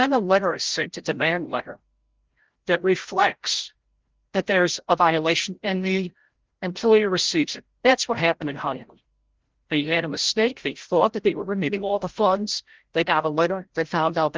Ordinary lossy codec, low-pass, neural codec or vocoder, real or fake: Opus, 16 kbps; 7.2 kHz; codec, 16 kHz, 0.5 kbps, FreqCodec, larger model; fake